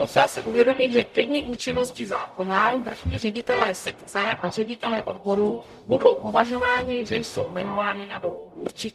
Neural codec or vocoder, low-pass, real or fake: codec, 44.1 kHz, 0.9 kbps, DAC; 14.4 kHz; fake